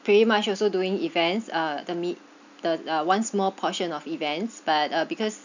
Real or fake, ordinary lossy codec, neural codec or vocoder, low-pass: real; none; none; 7.2 kHz